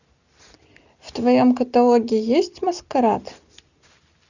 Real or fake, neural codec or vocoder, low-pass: real; none; 7.2 kHz